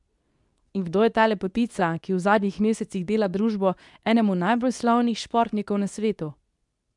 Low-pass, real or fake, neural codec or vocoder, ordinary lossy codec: 10.8 kHz; fake; codec, 24 kHz, 0.9 kbps, WavTokenizer, medium speech release version 2; none